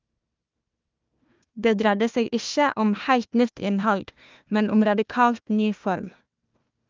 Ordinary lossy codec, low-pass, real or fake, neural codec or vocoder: Opus, 24 kbps; 7.2 kHz; fake; codec, 16 kHz, 1 kbps, FunCodec, trained on Chinese and English, 50 frames a second